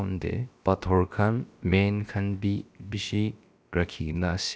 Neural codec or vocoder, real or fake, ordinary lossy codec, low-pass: codec, 16 kHz, about 1 kbps, DyCAST, with the encoder's durations; fake; none; none